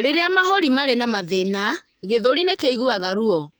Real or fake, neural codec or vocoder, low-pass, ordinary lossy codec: fake; codec, 44.1 kHz, 2.6 kbps, SNAC; none; none